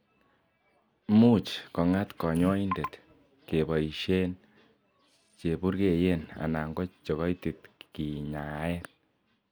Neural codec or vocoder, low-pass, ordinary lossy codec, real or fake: none; none; none; real